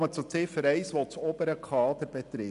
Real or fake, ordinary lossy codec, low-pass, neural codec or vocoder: real; none; 10.8 kHz; none